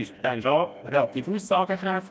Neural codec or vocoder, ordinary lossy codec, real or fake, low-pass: codec, 16 kHz, 1 kbps, FreqCodec, smaller model; none; fake; none